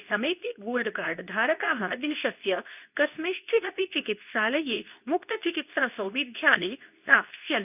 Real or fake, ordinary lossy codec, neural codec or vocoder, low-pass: fake; none; codec, 24 kHz, 0.9 kbps, WavTokenizer, medium speech release version 2; 3.6 kHz